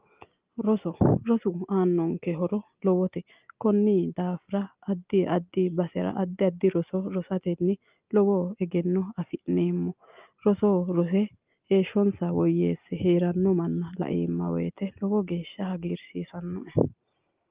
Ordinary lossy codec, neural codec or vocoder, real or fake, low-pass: Opus, 24 kbps; none; real; 3.6 kHz